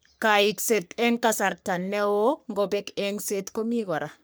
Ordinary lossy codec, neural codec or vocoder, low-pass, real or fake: none; codec, 44.1 kHz, 3.4 kbps, Pupu-Codec; none; fake